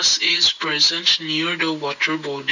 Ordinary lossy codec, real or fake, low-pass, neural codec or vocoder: none; real; 7.2 kHz; none